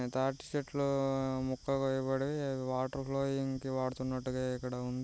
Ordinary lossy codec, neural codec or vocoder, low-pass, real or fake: none; none; none; real